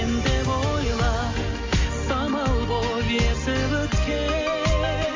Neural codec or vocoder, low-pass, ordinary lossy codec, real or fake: none; 7.2 kHz; MP3, 64 kbps; real